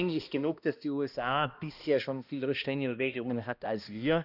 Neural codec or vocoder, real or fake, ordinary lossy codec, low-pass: codec, 16 kHz, 1 kbps, X-Codec, HuBERT features, trained on balanced general audio; fake; none; 5.4 kHz